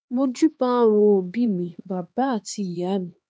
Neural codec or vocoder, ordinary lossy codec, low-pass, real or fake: codec, 16 kHz, 2 kbps, X-Codec, HuBERT features, trained on LibriSpeech; none; none; fake